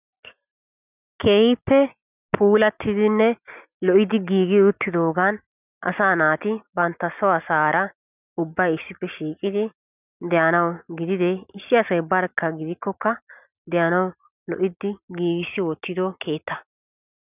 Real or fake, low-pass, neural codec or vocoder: real; 3.6 kHz; none